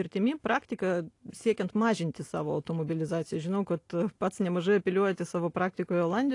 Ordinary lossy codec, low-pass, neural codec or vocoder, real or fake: AAC, 48 kbps; 10.8 kHz; none; real